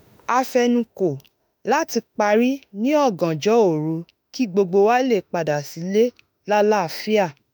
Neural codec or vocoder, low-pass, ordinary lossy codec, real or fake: autoencoder, 48 kHz, 32 numbers a frame, DAC-VAE, trained on Japanese speech; none; none; fake